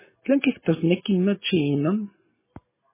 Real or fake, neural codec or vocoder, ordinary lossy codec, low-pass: real; none; MP3, 16 kbps; 3.6 kHz